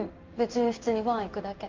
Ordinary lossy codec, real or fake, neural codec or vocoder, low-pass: Opus, 24 kbps; fake; codec, 16 kHz in and 24 kHz out, 1 kbps, XY-Tokenizer; 7.2 kHz